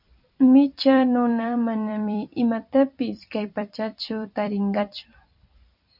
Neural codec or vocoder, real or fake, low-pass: vocoder, 44.1 kHz, 128 mel bands every 256 samples, BigVGAN v2; fake; 5.4 kHz